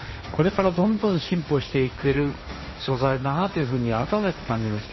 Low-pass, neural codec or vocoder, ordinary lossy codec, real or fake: 7.2 kHz; codec, 16 kHz, 1.1 kbps, Voila-Tokenizer; MP3, 24 kbps; fake